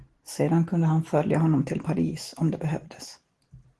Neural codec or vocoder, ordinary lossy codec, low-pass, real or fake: none; Opus, 16 kbps; 10.8 kHz; real